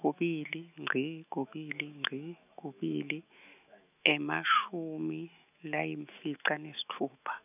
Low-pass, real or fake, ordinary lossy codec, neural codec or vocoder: 3.6 kHz; fake; none; autoencoder, 48 kHz, 128 numbers a frame, DAC-VAE, trained on Japanese speech